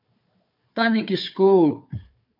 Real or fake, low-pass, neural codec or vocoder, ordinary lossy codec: fake; 5.4 kHz; codec, 16 kHz, 4 kbps, FunCodec, trained on Chinese and English, 50 frames a second; MP3, 48 kbps